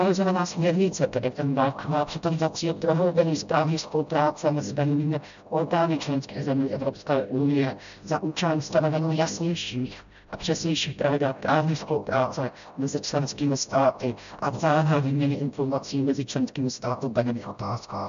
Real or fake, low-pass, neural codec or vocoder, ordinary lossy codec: fake; 7.2 kHz; codec, 16 kHz, 0.5 kbps, FreqCodec, smaller model; AAC, 96 kbps